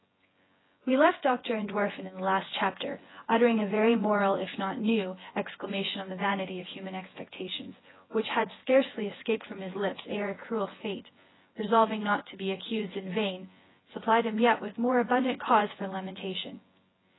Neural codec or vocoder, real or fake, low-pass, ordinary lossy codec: vocoder, 24 kHz, 100 mel bands, Vocos; fake; 7.2 kHz; AAC, 16 kbps